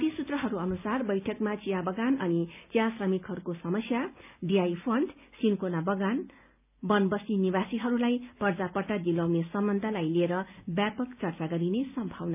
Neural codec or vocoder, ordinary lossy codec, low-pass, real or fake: none; none; 3.6 kHz; real